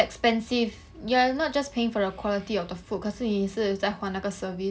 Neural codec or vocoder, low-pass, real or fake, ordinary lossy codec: none; none; real; none